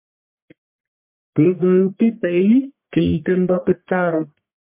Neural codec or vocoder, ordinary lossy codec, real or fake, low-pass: codec, 44.1 kHz, 1.7 kbps, Pupu-Codec; MP3, 32 kbps; fake; 3.6 kHz